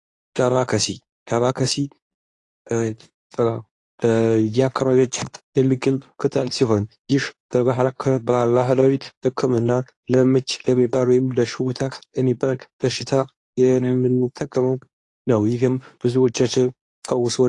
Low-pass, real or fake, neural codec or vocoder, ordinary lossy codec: 10.8 kHz; fake; codec, 24 kHz, 0.9 kbps, WavTokenizer, medium speech release version 2; AAC, 48 kbps